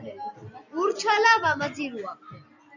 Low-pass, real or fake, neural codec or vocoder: 7.2 kHz; real; none